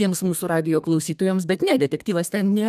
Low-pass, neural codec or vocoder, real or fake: 14.4 kHz; codec, 32 kHz, 1.9 kbps, SNAC; fake